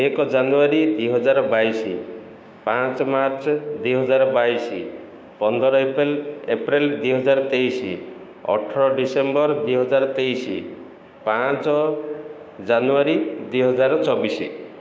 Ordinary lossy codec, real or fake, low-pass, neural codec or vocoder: none; fake; none; codec, 16 kHz, 6 kbps, DAC